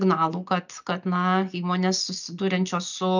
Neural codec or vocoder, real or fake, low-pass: none; real; 7.2 kHz